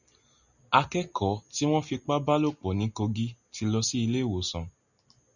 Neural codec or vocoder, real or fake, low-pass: none; real; 7.2 kHz